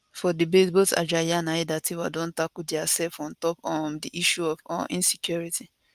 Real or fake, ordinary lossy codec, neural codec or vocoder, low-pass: real; Opus, 32 kbps; none; 14.4 kHz